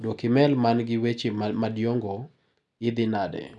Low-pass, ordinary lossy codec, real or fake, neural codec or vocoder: 10.8 kHz; none; real; none